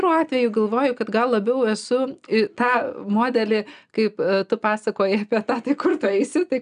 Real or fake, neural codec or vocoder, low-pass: real; none; 9.9 kHz